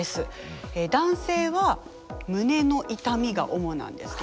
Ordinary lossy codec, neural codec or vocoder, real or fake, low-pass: none; none; real; none